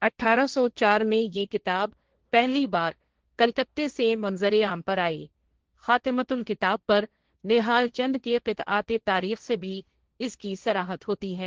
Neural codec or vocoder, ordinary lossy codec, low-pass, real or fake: codec, 16 kHz, 1.1 kbps, Voila-Tokenizer; Opus, 16 kbps; 7.2 kHz; fake